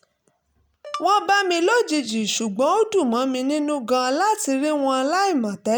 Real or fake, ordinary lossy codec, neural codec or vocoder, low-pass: real; none; none; none